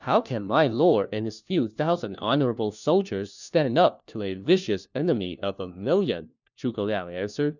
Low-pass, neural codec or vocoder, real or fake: 7.2 kHz; codec, 16 kHz, 1 kbps, FunCodec, trained on LibriTTS, 50 frames a second; fake